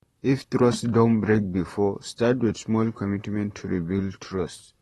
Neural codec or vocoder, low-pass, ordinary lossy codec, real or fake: vocoder, 44.1 kHz, 128 mel bands, Pupu-Vocoder; 19.8 kHz; AAC, 32 kbps; fake